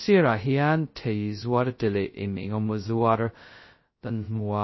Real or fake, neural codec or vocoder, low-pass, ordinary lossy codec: fake; codec, 16 kHz, 0.2 kbps, FocalCodec; 7.2 kHz; MP3, 24 kbps